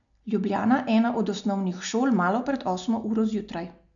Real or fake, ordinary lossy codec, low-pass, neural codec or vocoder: real; none; 7.2 kHz; none